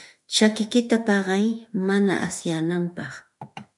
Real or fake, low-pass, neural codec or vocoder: fake; 10.8 kHz; autoencoder, 48 kHz, 32 numbers a frame, DAC-VAE, trained on Japanese speech